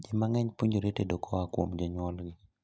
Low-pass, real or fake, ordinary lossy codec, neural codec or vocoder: none; real; none; none